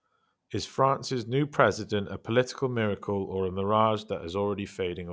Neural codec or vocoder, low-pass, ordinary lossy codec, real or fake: none; none; none; real